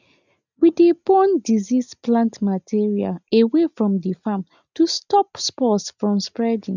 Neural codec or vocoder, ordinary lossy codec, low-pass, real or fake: none; none; 7.2 kHz; real